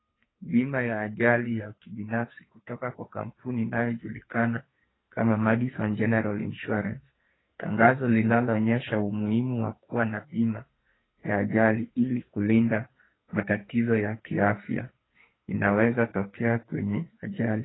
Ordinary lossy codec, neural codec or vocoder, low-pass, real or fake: AAC, 16 kbps; codec, 44.1 kHz, 2.6 kbps, SNAC; 7.2 kHz; fake